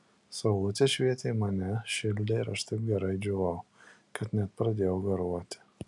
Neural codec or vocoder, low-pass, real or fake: none; 10.8 kHz; real